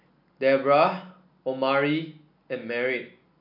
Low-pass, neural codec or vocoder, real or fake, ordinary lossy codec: 5.4 kHz; none; real; AAC, 48 kbps